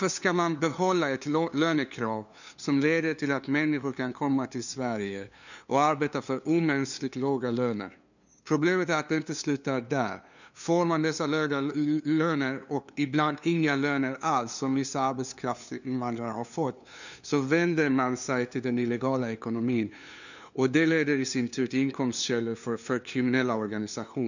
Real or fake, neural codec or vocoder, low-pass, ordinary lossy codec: fake; codec, 16 kHz, 2 kbps, FunCodec, trained on LibriTTS, 25 frames a second; 7.2 kHz; none